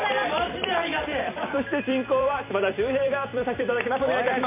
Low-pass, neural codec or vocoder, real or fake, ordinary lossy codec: 3.6 kHz; vocoder, 44.1 kHz, 128 mel bands every 256 samples, BigVGAN v2; fake; MP3, 24 kbps